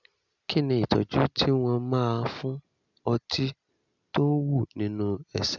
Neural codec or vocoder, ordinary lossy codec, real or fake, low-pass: none; none; real; 7.2 kHz